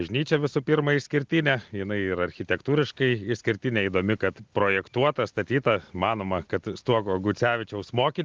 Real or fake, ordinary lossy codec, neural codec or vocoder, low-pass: real; Opus, 24 kbps; none; 7.2 kHz